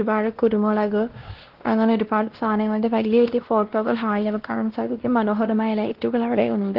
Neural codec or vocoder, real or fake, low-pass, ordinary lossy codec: codec, 16 kHz, 1 kbps, X-Codec, WavLM features, trained on Multilingual LibriSpeech; fake; 5.4 kHz; Opus, 16 kbps